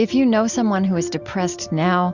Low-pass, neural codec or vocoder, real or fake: 7.2 kHz; none; real